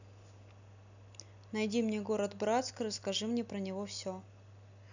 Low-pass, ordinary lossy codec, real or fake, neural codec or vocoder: 7.2 kHz; none; real; none